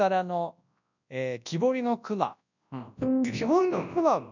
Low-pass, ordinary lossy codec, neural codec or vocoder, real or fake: 7.2 kHz; none; codec, 24 kHz, 0.9 kbps, WavTokenizer, large speech release; fake